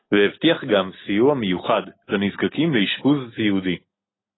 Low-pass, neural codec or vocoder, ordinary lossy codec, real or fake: 7.2 kHz; none; AAC, 16 kbps; real